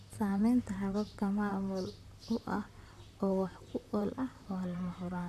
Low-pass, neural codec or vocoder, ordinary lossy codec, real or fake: 14.4 kHz; vocoder, 44.1 kHz, 128 mel bands, Pupu-Vocoder; none; fake